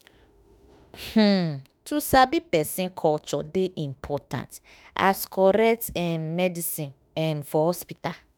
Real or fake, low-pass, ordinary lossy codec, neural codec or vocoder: fake; none; none; autoencoder, 48 kHz, 32 numbers a frame, DAC-VAE, trained on Japanese speech